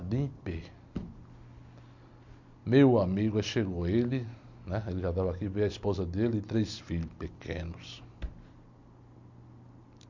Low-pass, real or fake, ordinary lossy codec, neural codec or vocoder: 7.2 kHz; real; MP3, 48 kbps; none